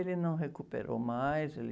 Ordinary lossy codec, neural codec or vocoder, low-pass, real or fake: none; none; none; real